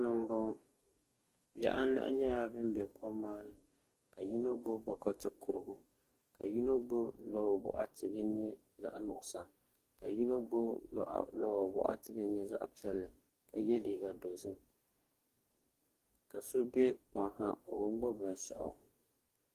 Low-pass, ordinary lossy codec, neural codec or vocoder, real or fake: 14.4 kHz; Opus, 24 kbps; codec, 44.1 kHz, 2.6 kbps, DAC; fake